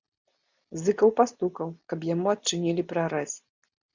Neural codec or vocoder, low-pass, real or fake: none; 7.2 kHz; real